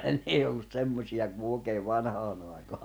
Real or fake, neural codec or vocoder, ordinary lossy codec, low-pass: real; none; none; none